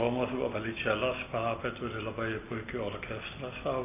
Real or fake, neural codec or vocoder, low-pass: real; none; 3.6 kHz